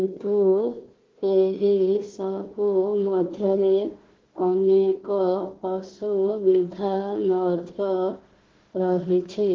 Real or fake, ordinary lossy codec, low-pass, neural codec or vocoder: fake; Opus, 16 kbps; 7.2 kHz; codec, 16 kHz, 1 kbps, FunCodec, trained on Chinese and English, 50 frames a second